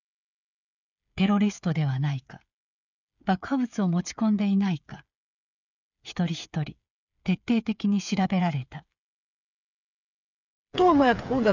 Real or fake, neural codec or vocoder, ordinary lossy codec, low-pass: fake; codec, 16 kHz, 8 kbps, FreqCodec, smaller model; none; 7.2 kHz